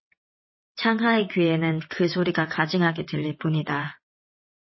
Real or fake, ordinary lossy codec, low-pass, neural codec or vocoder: fake; MP3, 24 kbps; 7.2 kHz; vocoder, 22.05 kHz, 80 mel bands, WaveNeXt